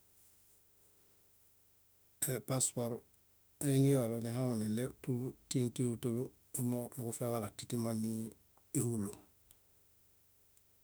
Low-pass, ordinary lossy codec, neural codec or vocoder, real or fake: none; none; autoencoder, 48 kHz, 32 numbers a frame, DAC-VAE, trained on Japanese speech; fake